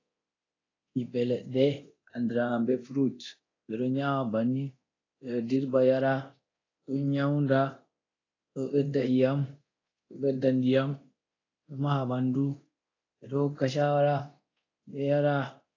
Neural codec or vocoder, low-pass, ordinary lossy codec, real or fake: codec, 24 kHz, 0.9 kbps, DualCodec; 7.2 kHz; AAC, 32 kbps; fake